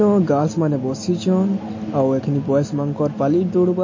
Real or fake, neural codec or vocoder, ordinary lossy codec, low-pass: real; none; MP3, 32 kbps; 7.2 kHz